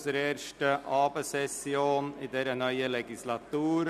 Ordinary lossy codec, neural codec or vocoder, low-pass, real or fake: none; none; 14.4 kHz; real